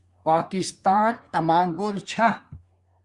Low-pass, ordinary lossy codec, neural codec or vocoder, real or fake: 10.8 kHz; Opus, 64 kbps; codec, 24 kHz, 1 kbps, SNAC; fake